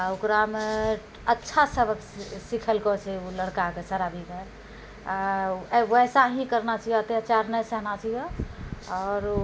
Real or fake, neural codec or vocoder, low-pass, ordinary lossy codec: real; none; none; none